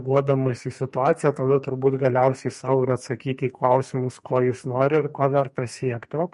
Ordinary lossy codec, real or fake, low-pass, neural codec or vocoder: MP3, 48 kbps; fake; 14.4 kHz; codec, 44.1 kHz, 2.6 kbps, SNAC